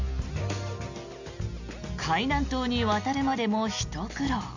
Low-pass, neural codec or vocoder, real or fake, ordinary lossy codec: 7.2 kHz; none; real; none